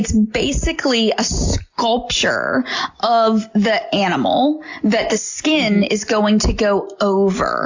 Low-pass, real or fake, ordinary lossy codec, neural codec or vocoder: 7.2 kHz; real; AAC, 48 kbps; none